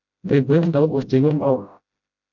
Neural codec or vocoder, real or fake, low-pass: codec, 16 kHz, 0.5 kbps, FreqCodec, smaller model; fake; 7.2 kHz